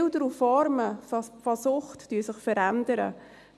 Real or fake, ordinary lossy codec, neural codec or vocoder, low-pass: real; none; none; none